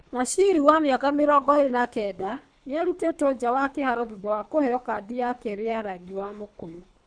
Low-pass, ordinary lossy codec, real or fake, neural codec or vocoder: 9.9 kHz; none; fake; codec, 24 kHz, 3 kbps, HILCodec